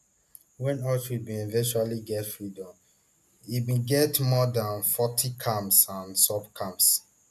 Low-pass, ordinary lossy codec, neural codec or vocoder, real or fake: 14.4 kHz; none; none; real